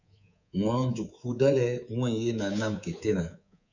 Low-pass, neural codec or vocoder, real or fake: 7.2 kHz; codec, 24 kHz, 3.1 kbps, DualCodec; fake